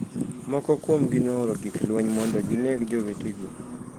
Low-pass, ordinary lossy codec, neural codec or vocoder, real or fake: 19.8 kHz; Opus, 16 kbps; codec, 44.1 kHz, 7.8 kbps, DAC; fake